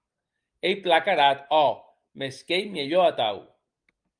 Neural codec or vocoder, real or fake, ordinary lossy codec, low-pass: none; real; Opus, 32 kbps; 9.9 kHz